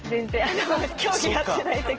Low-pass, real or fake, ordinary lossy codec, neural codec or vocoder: 7.2 kHz; real; Opus, 16 kbps; none